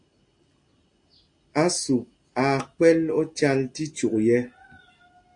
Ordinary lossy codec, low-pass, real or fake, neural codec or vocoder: AAC, 64 kbps; 9.9 kHz; real; none